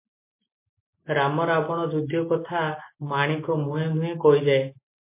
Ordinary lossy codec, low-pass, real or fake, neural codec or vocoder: MP3, 24 kbps; 3.6 kHz; real; none